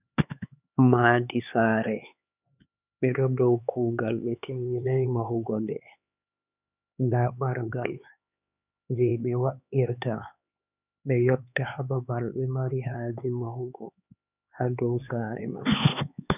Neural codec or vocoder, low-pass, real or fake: codec, 16 kHz, 4 kbps, X-Codec, HuBERT features, trained on LibriSpeech; 3.6 kHz; fake